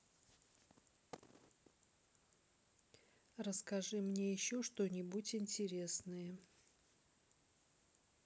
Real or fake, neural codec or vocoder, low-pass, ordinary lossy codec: real; none; none; none